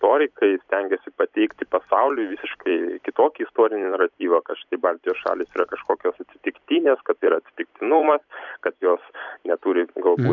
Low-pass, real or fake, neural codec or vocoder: 7.2 kHz; fake; vocoder, 44.1 kHz, 128 mel bands every 256 samples, BigVGAN v2